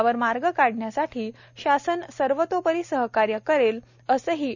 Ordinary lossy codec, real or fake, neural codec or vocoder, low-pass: none; real; none; 7.2 kHz